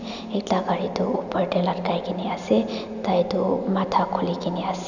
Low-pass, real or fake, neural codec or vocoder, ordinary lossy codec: 7.2 kHz; real; none; none